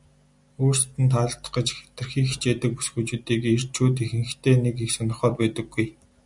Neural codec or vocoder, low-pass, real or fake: none; 10.8 kHz; real